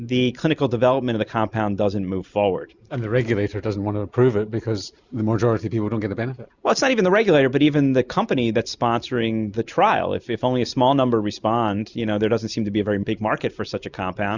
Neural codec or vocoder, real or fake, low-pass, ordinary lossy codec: none; real; 7.2 kHz; Opus, 64 kbps